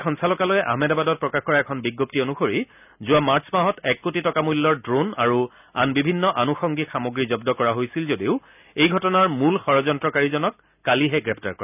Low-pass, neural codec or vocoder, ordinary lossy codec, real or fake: 3.6 kHz; none; none; real